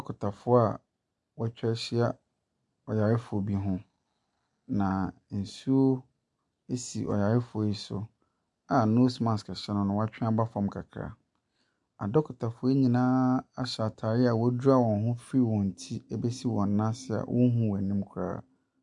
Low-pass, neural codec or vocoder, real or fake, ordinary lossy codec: 10.8 kHz; none; real; MP3, 96 kbps